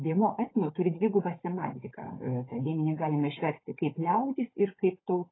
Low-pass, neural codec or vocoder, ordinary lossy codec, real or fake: 7.2 kHz; codec, 16 kHz, 16 kbps, FunCodec, trained on Chinese and English, 50 frames a second; AAC, 16 kbps; fake